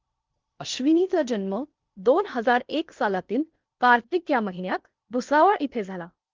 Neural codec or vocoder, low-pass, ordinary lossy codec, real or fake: codec, 16 kHz in and 24 kHz out, 0.8 kbps, FocalCodec, streaming, 65536 codes; 7.2 kHz; Opus, 32 kbps; fake